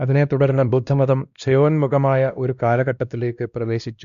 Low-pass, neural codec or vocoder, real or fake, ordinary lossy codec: 7.2 kHz; codec, 16 kHz, 1 kbps, X-Codec, WavLM features, trained on Multilingual LibriSpeech; fake; none